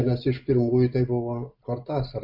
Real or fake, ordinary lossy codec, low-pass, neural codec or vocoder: real; AAC, 48 kbps; 5.4 kHz; none